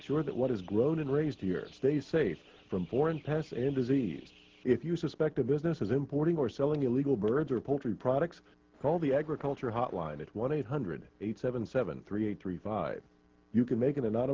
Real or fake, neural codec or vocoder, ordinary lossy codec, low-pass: real; none; Opus, 16 kbps; 7.2 kHz